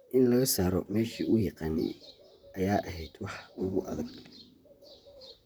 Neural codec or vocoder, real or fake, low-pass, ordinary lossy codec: vocoder, 44.1 kHz, 128 mel bands, Pupu-Vocoder; fake; none; none